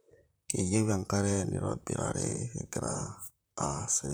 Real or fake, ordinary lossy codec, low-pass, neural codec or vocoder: fake; none; none; vocoder, 44.1 kHz, 128 mel bands, Pupu-Vocoder